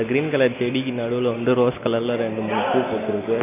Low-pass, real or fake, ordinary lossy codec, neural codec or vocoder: 3.6 kHz; real; none; none